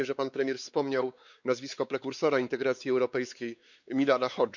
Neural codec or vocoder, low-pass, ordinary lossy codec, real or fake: codec, 16 kHz, 4 kbps, X-Codec, WavLM features, trained on Multilingual LibriSpeech; 7.2 kHz; none; fake